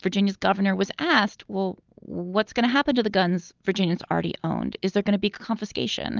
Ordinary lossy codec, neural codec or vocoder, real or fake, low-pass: Opus, 32 kbps; none; real; 7.2 kHz